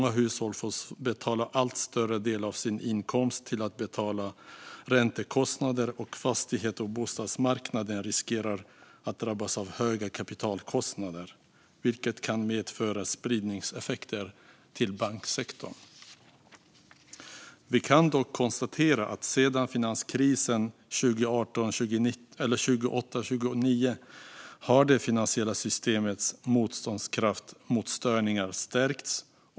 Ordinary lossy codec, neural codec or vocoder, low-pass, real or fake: none; none; none; real